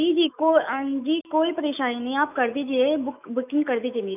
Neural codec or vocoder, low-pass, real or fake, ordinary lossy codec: autoencoder, 48 kHz, 128 numbers a frame, DAC-VAE, trained on Japanese speech; 3.6 kHz; fake; none